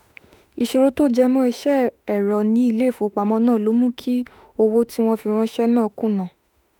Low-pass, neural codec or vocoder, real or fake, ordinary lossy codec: 19.8 kHz; autoencoder, 48 kHz, 32 numbers a frame, DAC-VAE, trained on Japanese speech; fake; none